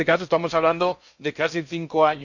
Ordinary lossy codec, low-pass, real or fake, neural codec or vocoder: none; 7.2 kHz; fake; codec, 16 kHz in and 24 kHz out, 0.8 kbps, FocalCodec, streaming, 65536 codes